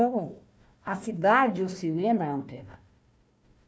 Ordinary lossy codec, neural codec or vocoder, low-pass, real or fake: none; codec, 16 kHz, 1 kbps, FunCodec, trained on Chinese and English, 50 frames a second; none; fake